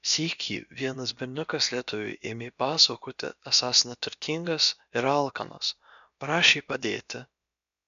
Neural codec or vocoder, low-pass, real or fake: codec, 16 kHz, about 1 kbps, DyCAST, with the encoder's durations; 7.2 kHz; fake